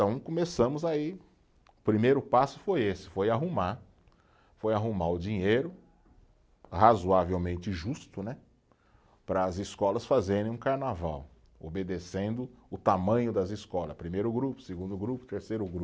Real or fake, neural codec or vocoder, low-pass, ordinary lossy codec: real; none; none; none